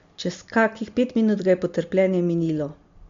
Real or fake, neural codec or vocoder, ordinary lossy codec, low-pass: real; none; MP3, 48 kbps; 7.2 kHz